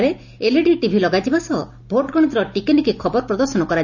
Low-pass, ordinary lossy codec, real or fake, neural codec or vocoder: 7.2 kHz; none; real; none